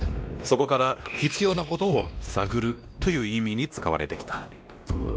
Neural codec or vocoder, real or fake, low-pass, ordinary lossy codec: codec, 16 kHz, 1 kbps, X-Codec, WavLM features, trained on Multilingual LibriSpeech; fake; none; none